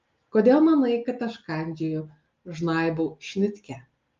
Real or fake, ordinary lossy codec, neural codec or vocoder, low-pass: real; Opus, 32 kbps; none; 7.2 kHz